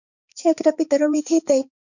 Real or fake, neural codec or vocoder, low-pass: fake; codec, 16 kHz, 4 kbps, X-Codec, HuBERT features, trained on balanced general audio; 7.2 kHz